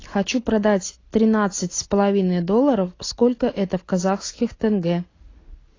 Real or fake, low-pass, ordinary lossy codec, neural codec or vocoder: real; 7.2 kHz; AAC, 32 kbps; none